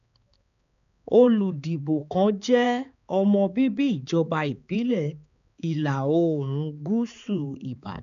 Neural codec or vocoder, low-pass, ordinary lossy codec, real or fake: codec, 16 kHz, 4 kbps, X-Codec, HuBERT features, trained on balanced general audio; 7.2 kHz; none; fake